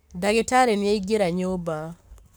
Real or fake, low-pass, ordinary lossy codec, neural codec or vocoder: fake; none; none; codec, 44.1 kHz, 7.8 kbps, Pupu-Codec